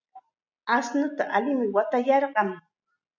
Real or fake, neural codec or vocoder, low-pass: real; none; 7.2 kHz